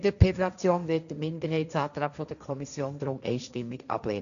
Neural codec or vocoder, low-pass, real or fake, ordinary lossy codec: codec, 16 kHz, 1.1 kbps, Voila-Tokenizer; 7.2 kHz; fake; none